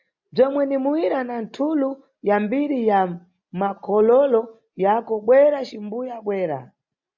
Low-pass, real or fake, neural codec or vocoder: 7.2 kHz; real; none